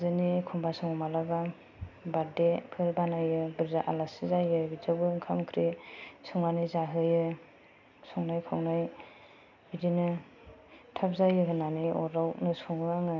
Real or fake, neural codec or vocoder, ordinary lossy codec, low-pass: real; none; none; 7.2 kHz